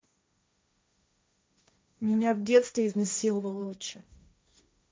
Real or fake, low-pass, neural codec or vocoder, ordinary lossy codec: fake; none; codec, 16 kHz, 1.1 kbps, Voila-Tokenizer; none